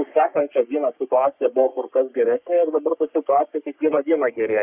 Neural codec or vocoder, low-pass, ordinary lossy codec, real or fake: codec, 44.1 kHz, 3.4 kbps, Pupu-Codec; 3.6 kHz; Opus, 64 kbps; fake